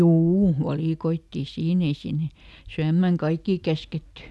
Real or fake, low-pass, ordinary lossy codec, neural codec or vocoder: real; none; none; none